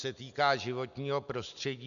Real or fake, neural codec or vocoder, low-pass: real; none; 7.2 kHz